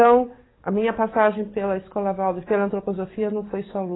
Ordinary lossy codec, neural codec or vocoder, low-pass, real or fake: AAC, 16 kbps; codec, 44.1 kHz, 7.8 kbps, DAC; 7.2 kHz; fake